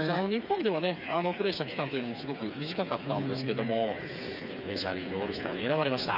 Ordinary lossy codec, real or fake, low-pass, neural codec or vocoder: none; fake; 5.4 kHz; codec, 16 kHz, 4 kbps, FreqCodec, smaller model